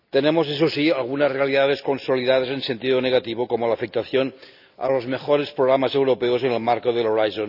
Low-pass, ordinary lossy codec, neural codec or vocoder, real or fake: 5.4 kHz; none; none; real